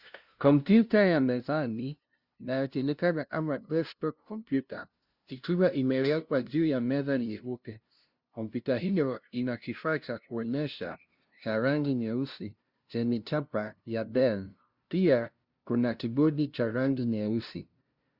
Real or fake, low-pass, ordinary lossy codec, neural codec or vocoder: fake; 5.4 kHz; Opus, 64 kbps; codec, 16 kHz, 0.5 kbps, FunCodec, trained on LibriTTS, 25 frames a second